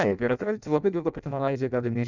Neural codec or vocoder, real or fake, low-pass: codec, 16 kHz in and 24 kHz out, 0.6 kbps, FireRedTTS-2 codec; fake; 7.2 kHz